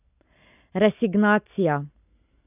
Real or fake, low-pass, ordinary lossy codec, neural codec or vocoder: real; 3.6 kHz; none; none